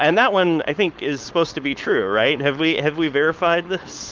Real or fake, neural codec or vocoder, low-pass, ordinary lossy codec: fake; codec, 16 kHz, 4.8 kbps, FACodec; 7.2 kHz; Opus, 24 kbps